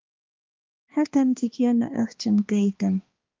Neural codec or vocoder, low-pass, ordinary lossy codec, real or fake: codec, 16 kHz, 2 kbps, X-Codec, HuBERT features, trained on balanced general audio; 7.2 kHz; Opus, 24 kbps; fake